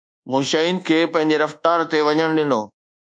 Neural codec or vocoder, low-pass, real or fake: codec, 24 kHz, 1.2 kbps, DualCodec; 9.9 kHz; fake